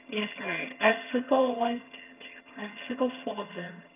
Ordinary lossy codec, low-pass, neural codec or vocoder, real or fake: none; 3.6 kHz; vocoder, 22.05 kHz, 80 mel bands, HiFi-GAN; fake